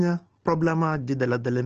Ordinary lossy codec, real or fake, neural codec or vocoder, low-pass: Opus, 16 kbps; real; none; 7.2 kHz